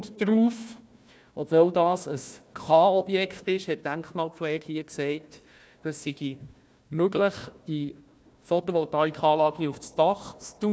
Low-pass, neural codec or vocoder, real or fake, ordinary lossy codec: none; codec, 16 kHz, 1 kbps, FunCodec, trained on Chinese and English, 50 frames a second; fake; none